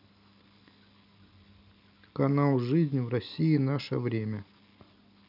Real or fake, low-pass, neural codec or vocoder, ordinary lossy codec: real; 5.4 kHz; none; none